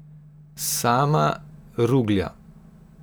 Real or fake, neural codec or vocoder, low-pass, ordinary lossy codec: real; none; none; none